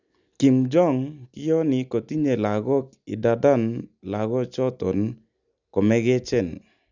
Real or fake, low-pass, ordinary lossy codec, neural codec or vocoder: real; 7.2 kHz; none; none